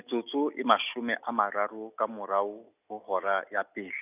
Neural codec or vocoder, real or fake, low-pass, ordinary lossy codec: none; real; 3.6 kHz; none